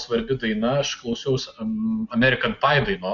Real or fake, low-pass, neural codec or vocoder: real; 7.2 kHz; none